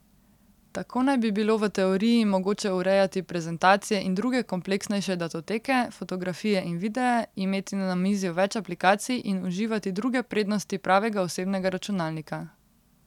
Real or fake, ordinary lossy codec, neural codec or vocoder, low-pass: real; none; none; 19.8 kHz